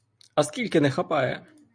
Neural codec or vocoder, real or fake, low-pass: none; real; 9.9 kHz